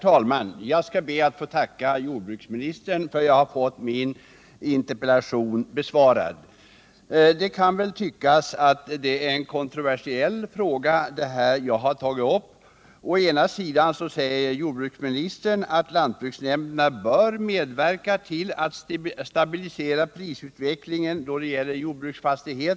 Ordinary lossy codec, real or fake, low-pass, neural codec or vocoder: none; real; none; none